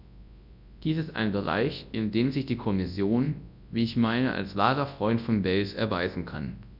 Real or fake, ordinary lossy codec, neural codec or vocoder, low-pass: fake; none; codec, 24 kHz, 0.9 kbps, WavTokenizer, large speech release; 5.4 kHz